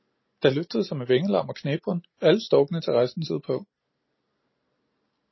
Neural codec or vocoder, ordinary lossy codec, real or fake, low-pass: none; MP3, 24 kbps; real; 7.2 kHz